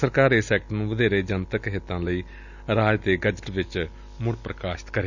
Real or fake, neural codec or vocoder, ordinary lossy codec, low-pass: real; none; none; 7.2 kHz